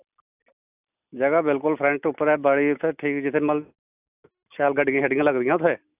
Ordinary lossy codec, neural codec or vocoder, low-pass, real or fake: none; none; 3.6 kHz; real